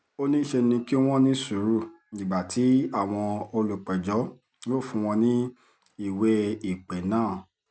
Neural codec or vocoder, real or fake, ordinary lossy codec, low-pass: none; real; none; none